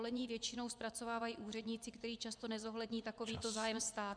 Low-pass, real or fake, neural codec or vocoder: 9.9 kHz; fake; vocoder, 24 kHz, 100 mel bands, Vocos